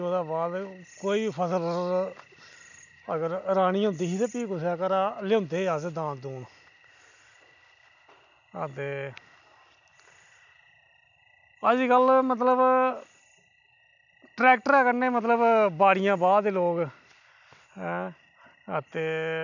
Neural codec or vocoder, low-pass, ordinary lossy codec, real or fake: none; 7.2 kHz; none; real